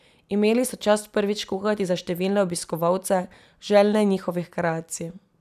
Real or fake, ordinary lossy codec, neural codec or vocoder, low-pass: real; none; none; 14.4 kHz